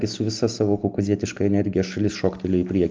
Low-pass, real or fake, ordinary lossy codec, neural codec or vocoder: 7.2 kHz; real; Opus, 32 kbps; none